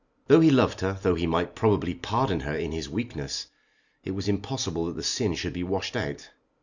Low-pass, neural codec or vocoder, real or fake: 7.2 kHz; none; real